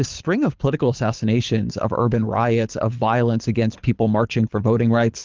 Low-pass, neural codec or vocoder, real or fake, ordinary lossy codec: 7.2 kHz; codec, 24 kHz, 6 kbps, HILCodec; fake; Opus, 32 kbps